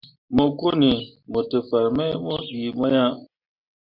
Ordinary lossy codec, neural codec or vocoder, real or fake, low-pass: MP3, 48 kbps; none; real; 5.4 kHz